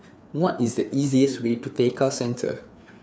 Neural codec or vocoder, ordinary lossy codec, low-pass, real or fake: codec, 16 kHz, 4 kbps, FreqCodec, larger model; none; none; fake